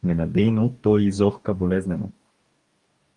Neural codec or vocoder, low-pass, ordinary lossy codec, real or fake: codec, 44.1 kHz, 2.6 kbps, DAC; 10.8 kHz; Opus, 32 kbps; fake